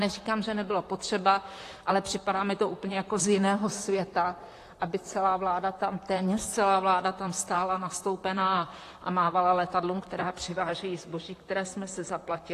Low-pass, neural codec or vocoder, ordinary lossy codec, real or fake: 14.4 kHz; vocoder, 44.1 kHz, 128 mel bands, Pupu-Vocoder; AAC, 48 kbps; fake